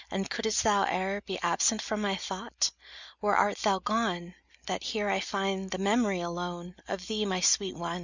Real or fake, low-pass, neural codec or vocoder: real; 7.2 kHz; none